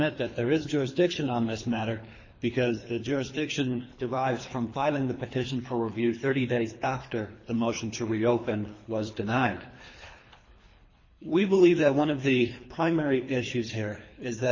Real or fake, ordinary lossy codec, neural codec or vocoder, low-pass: fake; MP3, 32 kbps; codec, 24 kHz, 3 kbps, HILCodec; 7.2 kHz